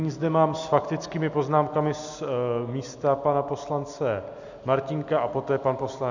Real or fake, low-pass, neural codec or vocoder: real; 7.2 kHz; none